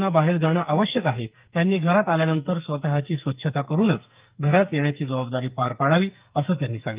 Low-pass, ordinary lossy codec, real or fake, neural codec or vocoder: 3.6 kHz; Opus, 32 kbps; fake; codec, 44.1 kHz, 2.6 kbps, SNAC